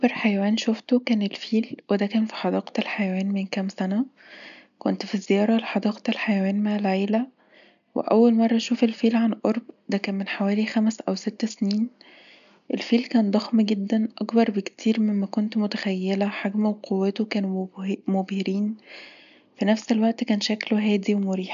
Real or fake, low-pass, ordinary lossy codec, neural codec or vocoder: real; 7.2 kHz; none; none